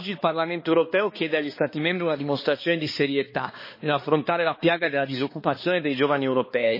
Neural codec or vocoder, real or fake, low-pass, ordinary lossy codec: codec, 16 kHz, 2 kbps, X-Codec, HuBERT features, trained on balanced general audio; fake; 5.4 kHz; MP3, 24 kbps